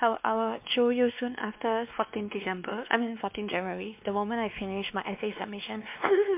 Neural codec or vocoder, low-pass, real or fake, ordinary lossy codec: codec, 16 kHz, 2 kbps, X-Codec, WavLM features, trained on Multilingual LibriSpeech; 3.6 kHz; fake; MP3, 32 kbps